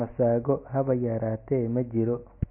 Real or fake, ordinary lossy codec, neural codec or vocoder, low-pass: real; MP3, 24 kbps; none; 3.6 kHz